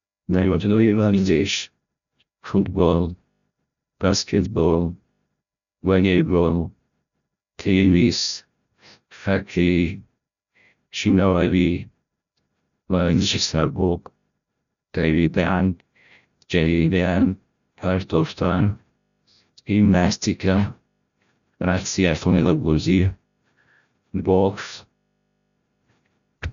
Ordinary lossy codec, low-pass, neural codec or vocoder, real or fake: none; 7.2 kHz; codec, 16 kHz, 0.5 kbps, FreqCodec, larger model; fake